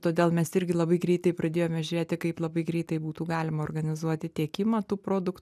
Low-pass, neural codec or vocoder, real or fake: 14.4 kHz; none; real